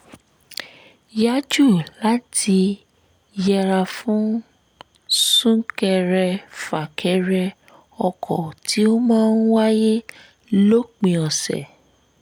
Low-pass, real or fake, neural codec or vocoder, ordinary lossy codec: none; real; none; none